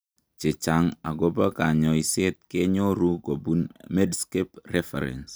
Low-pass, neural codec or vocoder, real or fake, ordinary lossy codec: none; none; real; none